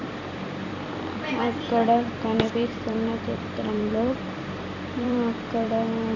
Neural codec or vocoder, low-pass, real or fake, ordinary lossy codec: vocoder, 44.1 kHz, 128 mel bands every 256 samples, BigVGAN v2; 7.2 kHz; fake; none